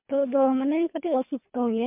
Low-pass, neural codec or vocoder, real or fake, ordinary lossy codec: 3.6 kHz; codec, 24 kHz, 3 kbps, HILCodec; fake; MP3, 32 kbps